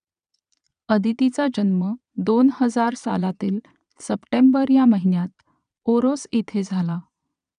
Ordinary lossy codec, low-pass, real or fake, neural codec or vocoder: none; 9.9 kHz; fake; vocoder, 22.05 kHz, 80 mel bands, Vocos